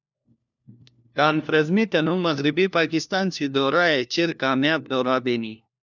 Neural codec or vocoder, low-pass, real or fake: codec, 16 kHz, 1 kbps, FunCodec, trained on LibriTTS, 50 frames a second; 7.2 kHz; fake